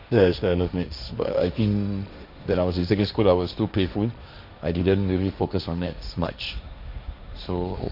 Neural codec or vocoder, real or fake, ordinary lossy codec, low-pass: codec, 16 kHz, 1.1 kbps, Voila-Tokenizer; fake; none; 5.4 kHz